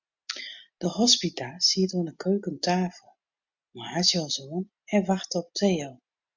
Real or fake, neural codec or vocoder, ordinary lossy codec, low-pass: real; none; MP3, 64 kbps; 7.2 kHz